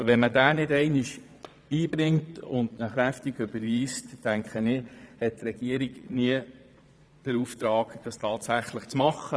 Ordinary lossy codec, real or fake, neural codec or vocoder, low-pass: none; fake; vocoder, 22.05 kHz, 80 mel bands, Vocos; none